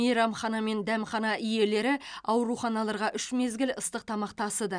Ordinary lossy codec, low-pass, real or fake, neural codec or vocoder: none; 9.9 kHz; real; none